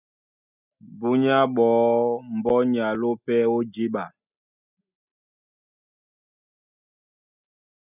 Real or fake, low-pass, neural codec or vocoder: real; 3.6 kHz; none